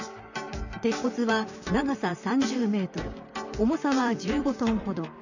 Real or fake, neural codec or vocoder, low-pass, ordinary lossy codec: fake; vocoder, 44.1 kHz, 128 mel bands, Pupu-Vocoder; 7.2 kHz; none